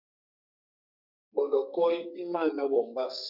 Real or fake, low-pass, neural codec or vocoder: fake; 5.4 kHz; codec, 32 kHz, 1.9 kbps, SNAC